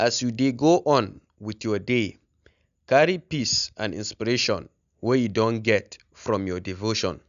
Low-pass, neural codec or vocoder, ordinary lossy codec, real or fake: 7.2 kHz; none; none; real